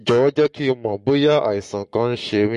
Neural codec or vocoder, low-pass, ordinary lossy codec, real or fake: codec, 44.1 kHz, 7.8 kbps, Pupu-Codec; 14.4 kHz; MP3, 48 kbps; fake